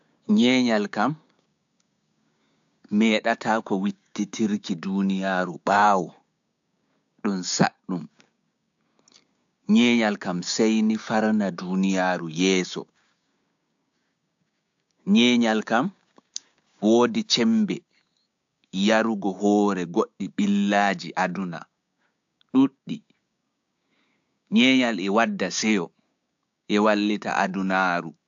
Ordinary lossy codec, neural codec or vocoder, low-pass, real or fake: AAC, 64 kbps; codec, 16 kHz, 6 kbps, DAC; 7.2 kHz; fake